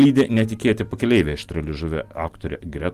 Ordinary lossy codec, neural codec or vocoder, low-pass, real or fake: Opus, 24 kbps; none; 14.4 kHz; real